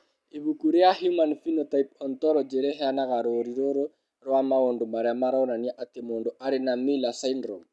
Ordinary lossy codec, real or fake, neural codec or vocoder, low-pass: none; real; none; none